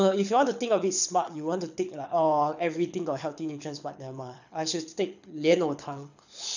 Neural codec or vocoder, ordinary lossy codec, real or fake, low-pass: codec, 24 kHz, 6 kbps, HILCodec; none; fake; 7.2 kHz